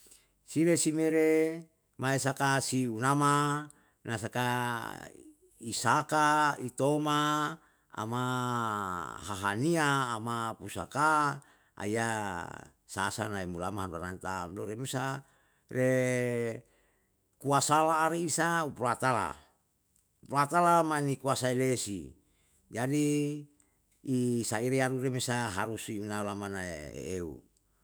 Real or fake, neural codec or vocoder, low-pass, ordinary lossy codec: fake; autoencoder, 48 kHz, 128 numbers a frame, DAC-VAE, trained on Japanese speech; none; none